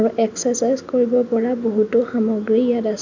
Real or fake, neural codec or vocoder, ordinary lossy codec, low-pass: real; none; none; 7.2 kHz